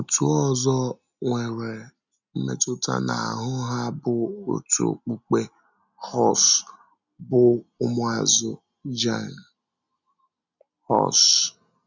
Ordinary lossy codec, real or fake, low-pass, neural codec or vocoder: none; real; 7.2 kHz; none